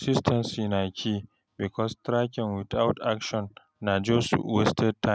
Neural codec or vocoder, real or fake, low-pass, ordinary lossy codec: none; real; none; none